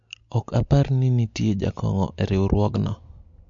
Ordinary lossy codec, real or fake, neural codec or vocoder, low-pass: MP3, 48 kbps; real; none; 7.2 kHz